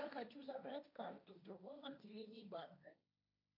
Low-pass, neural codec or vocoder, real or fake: 5.4 kHz; codec, 16 kHz, 1.1 kbps, Voila-Tokenizer; fake